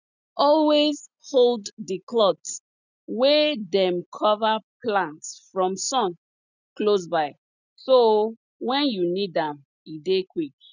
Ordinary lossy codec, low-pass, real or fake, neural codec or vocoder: none; 7.2 kHz; real; none